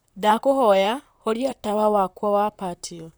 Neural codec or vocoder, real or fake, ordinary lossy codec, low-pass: vocoder, 44.1 kHz, 128 mel bands, Pupu-Vocoder; fake; none; none